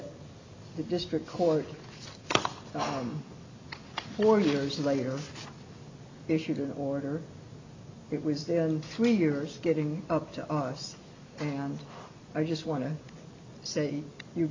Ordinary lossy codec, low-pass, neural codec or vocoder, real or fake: MP3, 64 kbps; 7.2 kHz; none; real